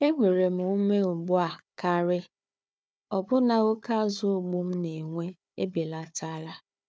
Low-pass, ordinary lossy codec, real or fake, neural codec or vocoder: none; none; fake; codec, 16 kHz, 4 kbps, FunCodec, trained on Chinese and English, 50 frames a second